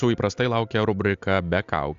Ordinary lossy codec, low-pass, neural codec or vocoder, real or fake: AAC, 64 kbps; 7.2 kHz; none; real